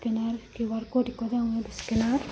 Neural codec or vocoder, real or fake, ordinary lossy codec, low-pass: none; real; none; none